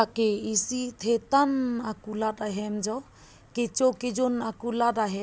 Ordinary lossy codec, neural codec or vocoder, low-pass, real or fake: none; none; none; real